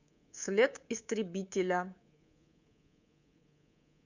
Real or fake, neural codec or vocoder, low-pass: fake; codec, 24 kHz, 3.1 kbps, DualCodec; 7.2 kHz